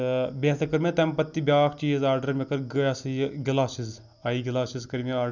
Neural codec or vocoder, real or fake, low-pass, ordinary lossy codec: none; real; 7.2 kHz; none